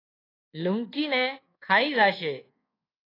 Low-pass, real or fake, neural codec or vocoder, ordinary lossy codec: 5.4 kHz; fake; codec, 24 kHz, 1.2 kbps, DualCodec; AAC, 24 kbps